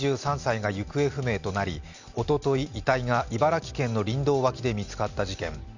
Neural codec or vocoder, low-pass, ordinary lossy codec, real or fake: none; 7.2 kHz; none; real